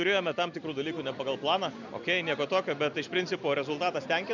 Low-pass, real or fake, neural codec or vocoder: 7.2 kHz; real; none